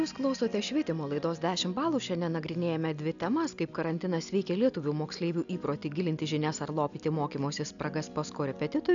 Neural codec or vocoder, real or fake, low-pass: none; real; 7.2 kHz